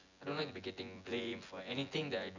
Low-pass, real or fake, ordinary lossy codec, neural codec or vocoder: 7.2 kHz; fake; none; vocoder, 24 kHz, 100 mel bands, Vocos